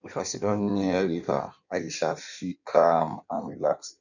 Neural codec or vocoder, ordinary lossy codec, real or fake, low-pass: codec, 16 kHz in and 24 kHz out, 1.1 kbps, FireRedTTS-2 codec; none; fake; 7.2 kHz